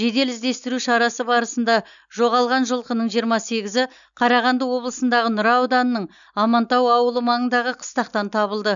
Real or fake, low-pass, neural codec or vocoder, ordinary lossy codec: real; 7.2 kHz; none; none